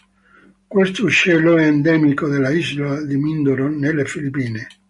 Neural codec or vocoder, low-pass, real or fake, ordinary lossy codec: none; 10.8 kHz; real; MP3, 96 kbps